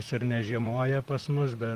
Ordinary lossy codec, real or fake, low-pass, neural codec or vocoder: Opus, 24 kbps; fake; 14.4 kHz; vocoder, 44.1 kHz, 128 mel bands, Pupu-Vocoder